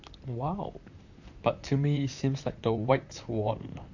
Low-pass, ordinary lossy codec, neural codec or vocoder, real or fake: 7.2 kHz; none; vocoder, 44.1 kHz, 128 mel bands, Pupu-Vocoder; fake